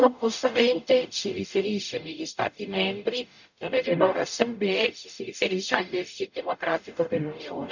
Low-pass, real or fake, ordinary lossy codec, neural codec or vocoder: 7.2 kHz; fake; none; codec, 44.1 kHz, 0.9 kbps, DAC